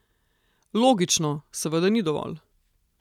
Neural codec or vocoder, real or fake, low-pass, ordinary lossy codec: none; real; 19.8 kHz; none